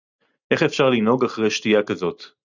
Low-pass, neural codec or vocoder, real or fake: 7.2 kHz; none; real